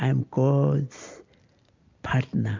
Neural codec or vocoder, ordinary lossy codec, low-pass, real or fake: none; MP3, 64 kbps; 7.2 kHz; real